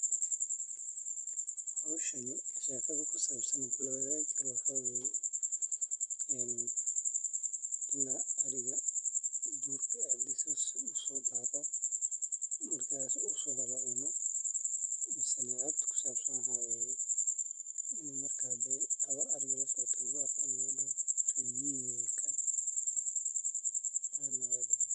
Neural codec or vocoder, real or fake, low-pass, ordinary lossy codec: vocoder, 44.1 kHz, 128 mel bands, Pupu-Vocoder; fake; 10.8 kHz; none